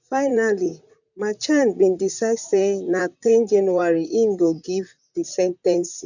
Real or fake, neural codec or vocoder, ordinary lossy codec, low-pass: fake; vocoder, 44.1 kHz, 128 mel bands, Pupu-Vocoder; none; 7.2 kHz